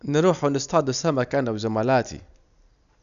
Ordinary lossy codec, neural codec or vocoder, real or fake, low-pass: none; none; real; 7.2 kHz